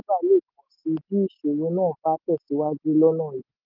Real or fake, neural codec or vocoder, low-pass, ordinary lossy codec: real; none; 5.4 kHz; Opus, 32 kbps